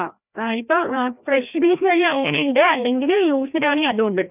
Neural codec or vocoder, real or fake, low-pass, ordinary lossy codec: codec, 16 kHz, 1 kbps, FreqCodec, larger model; fake; 3.6 kHz; none